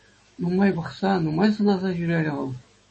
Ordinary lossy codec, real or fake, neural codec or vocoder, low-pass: MP3, 32 kbps; fake; autoencoder, 48 kHz, 128 numbers a frame, DAC-VAE, trained on Japanese speech; 10.8 kHz